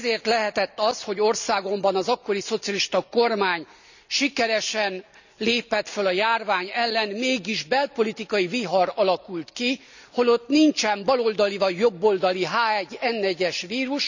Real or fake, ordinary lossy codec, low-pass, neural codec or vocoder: real; none; 7.2 kHz; none